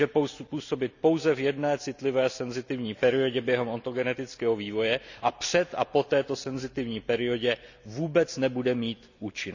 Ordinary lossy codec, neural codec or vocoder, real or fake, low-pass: none; none; real; 7.2 kHz